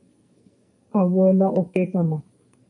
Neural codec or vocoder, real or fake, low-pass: codec, 44.1 kHz, 2.6 kbps, SNAC; fake; 10.8 kHz